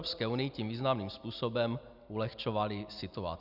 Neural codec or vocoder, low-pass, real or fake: none; 5.4 kHz; real